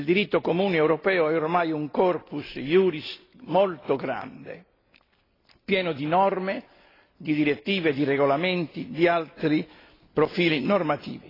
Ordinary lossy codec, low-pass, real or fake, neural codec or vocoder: AAC, 24 kbps; 5.4 kHz; real; none